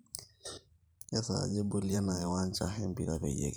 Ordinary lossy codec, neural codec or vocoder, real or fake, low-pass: none; vocoder, 44.1 kHz, 128 mel bands every 512 samples, BigVGAN v2; fake; none